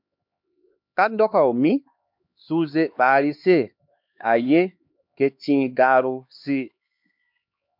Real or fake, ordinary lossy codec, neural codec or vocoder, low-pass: fake; MP3, 48 kbps; codec, 16 kHz, 2 kbps, X-Codec, HuBERT features, trained on LibriSpeech; 5.4 kHz